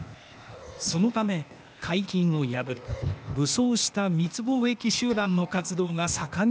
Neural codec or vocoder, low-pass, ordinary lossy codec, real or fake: codec, 16 kHz, 0.8 kbps, ZipCodec; none; none; fake